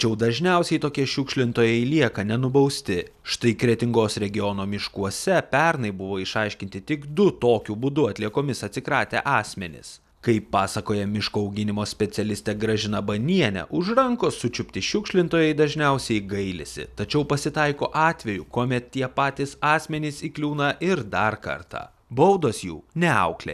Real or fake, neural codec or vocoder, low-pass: real; none; 14.4 kHz